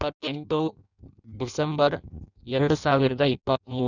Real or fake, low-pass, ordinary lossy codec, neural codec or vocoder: fake; 7.2 kHz; none; codec, 16 kHz in and 24 kHz out, 0.6 kbps, FireRedTTS-2 codec